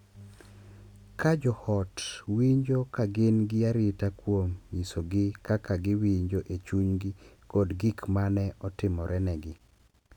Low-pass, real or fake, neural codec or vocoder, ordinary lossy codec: 19.8 kHz; real; none; none